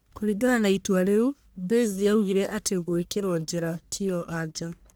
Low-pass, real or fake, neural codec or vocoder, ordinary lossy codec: none; fake; codec, 44.1 kHz, 1.7 kbps, Pupu-Codec; none